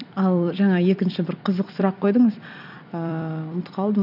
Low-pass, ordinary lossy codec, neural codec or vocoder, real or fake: 5.4 kHz; none; vocoder, 44.1 kHz, 128 mel bands every 256 samples, BigVGAN v2; fake